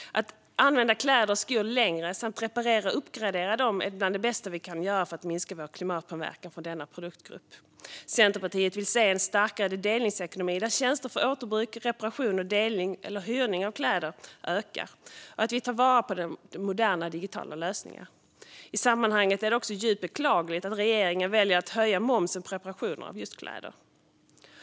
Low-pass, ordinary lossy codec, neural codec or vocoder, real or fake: none; none; none; real